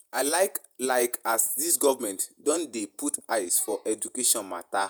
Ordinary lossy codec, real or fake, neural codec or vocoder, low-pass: none; fake; vocoder, 48 kHz, 128 mel bands, Vocos; none